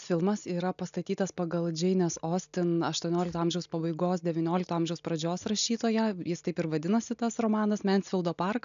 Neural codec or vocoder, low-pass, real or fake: none; 7.2 kHz; real